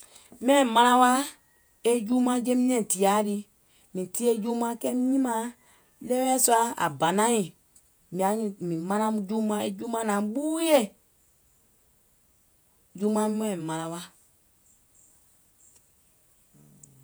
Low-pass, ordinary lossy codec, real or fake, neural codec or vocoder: none; none; fake; vocoder, 48 kHz, 128 mel bands, Vocos